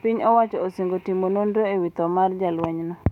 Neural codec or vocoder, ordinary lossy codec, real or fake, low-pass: autoencoder, 48 kHz, 128 numbers a frame, DAC-VAE, trained on Japanese speech; none; fake; 19.8 kHz